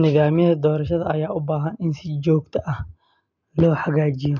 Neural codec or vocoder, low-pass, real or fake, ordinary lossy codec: none; 7.2 kHz; real; none